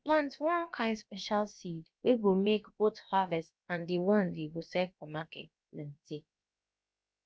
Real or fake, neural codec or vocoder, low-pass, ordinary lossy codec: fake; codec, 16 kHz, about 1 kbps, DyCAST, with the encoder's durations; none; none